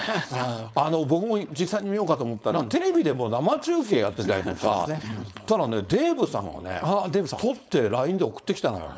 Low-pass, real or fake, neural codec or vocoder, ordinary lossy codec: none; fake; codec, 16 kHz, 4.8 kbps, FACodec; none